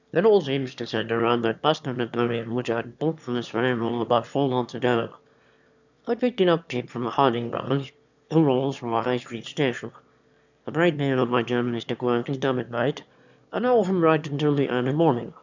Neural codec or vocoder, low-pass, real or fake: autoencoder, 22.05 kHz, a latent of 192 numbers a frame, VITS, trained on one speaker; 7.2 kHz; fake